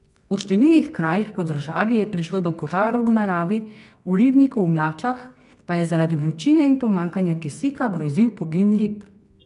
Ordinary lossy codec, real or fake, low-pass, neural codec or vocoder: none; fake; 10.8 kHz; codec, 24 kHz, 0.9 kbps, WavTokenizer, medium music audio release